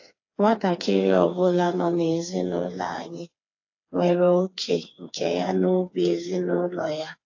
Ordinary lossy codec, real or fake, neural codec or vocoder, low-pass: AAC, 48 kbps; fake; codec, 16 kHz, 4 kbps, FreqCodec, smaller model; 7.2 kHz